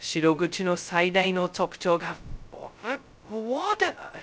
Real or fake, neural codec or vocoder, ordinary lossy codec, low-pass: fake; codec, 16 kHz, 0.2 kbps, FocalCodec; none; none